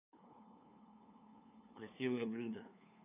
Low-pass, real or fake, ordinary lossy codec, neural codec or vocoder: 3.6 kHz; fake; none; codec, 16 kHz, 2 kbps, FunCodec, trained on LibriTTS, 25 frames a second